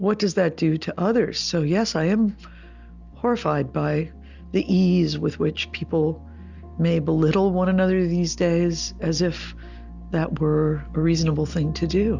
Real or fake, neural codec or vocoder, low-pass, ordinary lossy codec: real; none; 7.2 kHz; Opus, 64 kbps